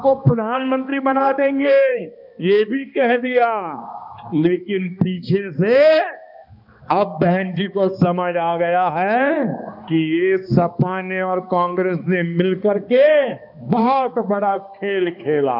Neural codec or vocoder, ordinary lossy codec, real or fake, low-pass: codec, 16 kHz, 2 kbps, X-Codec, HuBERT features, trained on balanced general audio; none; fake; 5.4 kHz